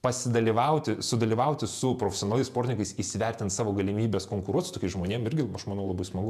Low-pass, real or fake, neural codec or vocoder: 14.4 kHz; fake; vocoder, 48 kHz, 128 mel bands, Vocos